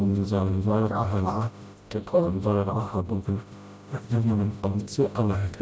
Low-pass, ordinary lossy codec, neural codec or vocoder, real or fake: none; none; codec, 16 kHz, 0.5 kbps, FreqCodec, smaller model; fake